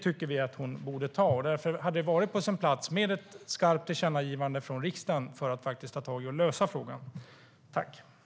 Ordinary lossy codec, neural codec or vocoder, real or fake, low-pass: none; none; real; none